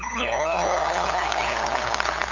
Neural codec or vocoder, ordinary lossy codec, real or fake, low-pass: codec, 16 kHz, 8 kbps, FunCodec, trained on LibriTTS, 25 frames a second; none; fake; 7.2 kHz